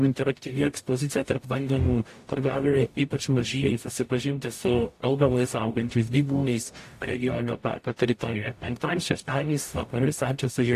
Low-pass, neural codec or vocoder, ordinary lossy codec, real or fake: 14.4 kHz; codec, 44.1 kHz, 0.9 kbps, DAC; AAC, 64 kbps; fake